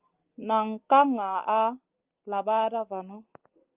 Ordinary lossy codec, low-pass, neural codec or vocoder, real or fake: Opus, 24 kbps; 3.6 kHz; none; real